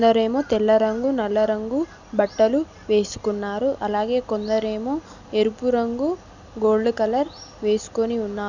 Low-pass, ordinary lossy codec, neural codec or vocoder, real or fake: 7.2 kHz; none; none; real